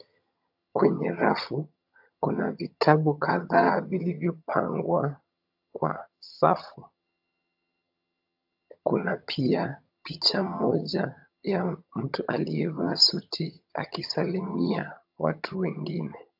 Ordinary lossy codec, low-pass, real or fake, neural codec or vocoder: AAC, 48 kbps; 5.4 kHz; fake; vocoder, 22.05 kHz, 80 mel bands, HiFi-GAN